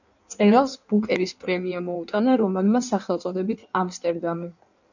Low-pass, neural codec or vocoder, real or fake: 7.2 kHz; codec, 16 kHz in and 24 kHz out, 1.1 kbps, FireRedTTS-2 codec; fake